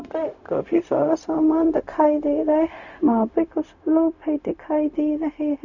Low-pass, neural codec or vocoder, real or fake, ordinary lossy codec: 7.2 kHz; codec, 16 kHz, 0.4 kbps, LongCat-Audio-Codec; fake; none